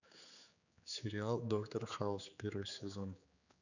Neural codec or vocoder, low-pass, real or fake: codec, 16 kHz, 4 kbps, X-Codec, HuBERT features, trained on general audio; 7.2 kHz; fake